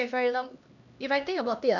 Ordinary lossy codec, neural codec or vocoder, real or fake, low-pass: none; codec, 16 kHz, 1 kbps, X-Codec, HuBERT features, trained on LibriSpeech; fake; 7.2 kHz